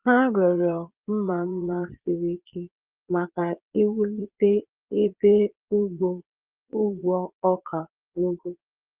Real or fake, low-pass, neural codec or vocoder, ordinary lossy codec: fake; 3.6 kHz; vocoder, 22.05 kHz, 80 mel bands, Vocos; Opus, 32 kbps